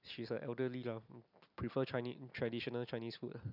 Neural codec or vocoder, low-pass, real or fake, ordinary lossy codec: none; 5.4 kHz; real; none